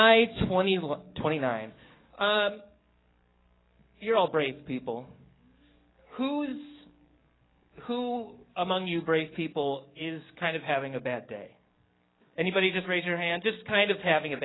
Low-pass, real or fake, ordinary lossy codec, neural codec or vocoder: 7.2 kHz; fake; AAC, 16 kbps; codec, 44.1 kHz, 7.8 kbps, DAC